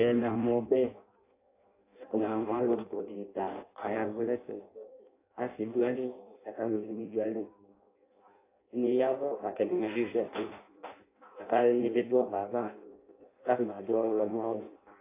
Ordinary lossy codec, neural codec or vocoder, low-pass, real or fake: AAC, 24 kbps; codec, 16 kHz in and 24 kHz out, 0.6 kbps, FireRedTTS-2 codec; 3.6 kHz; fake